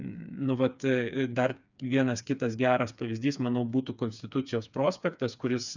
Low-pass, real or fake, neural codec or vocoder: 7.2 kHz; fake; codec, 16 kHz, 4 kbps, FreqCodec, smaller model